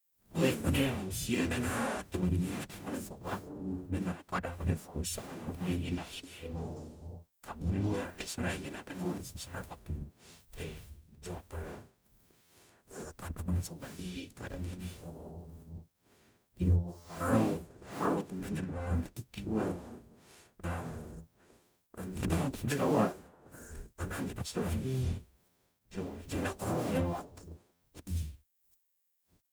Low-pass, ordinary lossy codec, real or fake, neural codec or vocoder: none; none; fake; codec, 44.1 kHz, 0.9 kbps, DAC